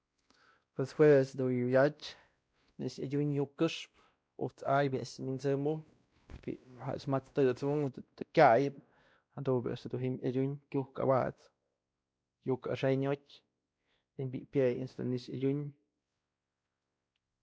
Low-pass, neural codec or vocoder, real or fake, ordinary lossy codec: none; codec, 16 kHz, 1 kbps, X-Codec, WavLM features, trained on Multilingual LibriSpeech; fake; none